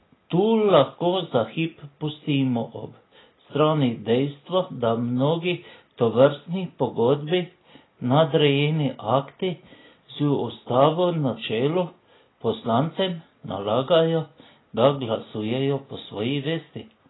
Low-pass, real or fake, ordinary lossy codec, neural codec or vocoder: 7.2 kHz; real; AAC, 16 kbps; none